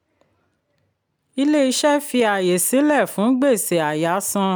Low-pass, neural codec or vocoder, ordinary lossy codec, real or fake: none; none; none; real